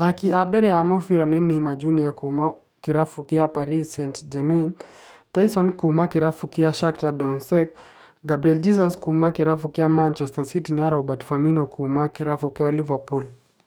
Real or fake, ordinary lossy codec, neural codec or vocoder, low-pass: fake; none; codec, 44.1 kHz, 2.6 kbps, DAC; none